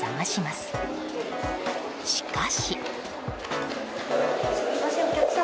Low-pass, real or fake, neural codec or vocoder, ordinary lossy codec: none; real; none; none